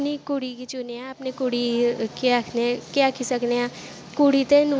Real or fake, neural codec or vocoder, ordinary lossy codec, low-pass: real; none; none; none